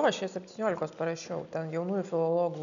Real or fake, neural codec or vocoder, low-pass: real; none; 7.2 kHz